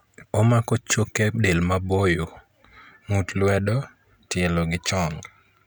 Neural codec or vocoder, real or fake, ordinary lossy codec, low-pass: none; real; none; none